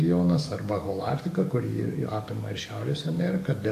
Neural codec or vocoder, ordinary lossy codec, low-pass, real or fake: codec, 44.1 kHz, 7.8 kbps, DAC; AAC, 64 kbps; 14.4 kHz; fake